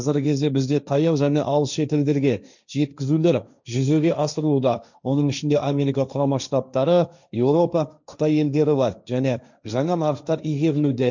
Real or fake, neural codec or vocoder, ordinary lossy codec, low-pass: fake; codec, 16 kHz, 1.1 kbps, Voila-Tokenizer; none; 7.2 kHz